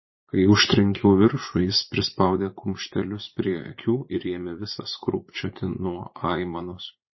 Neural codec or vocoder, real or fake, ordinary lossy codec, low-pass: vocoder, 44.1 kHz, 80 mel bands, Vocos; fake; MP3, 24 kbps; 7.2 kHz